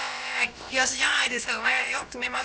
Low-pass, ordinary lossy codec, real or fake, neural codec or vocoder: none; none; fake; codec, 16 kHz, about 1 kbps, DyCAST, with the encoder's durations